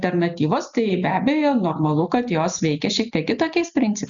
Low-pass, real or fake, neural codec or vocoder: 7.2 kHz; real; none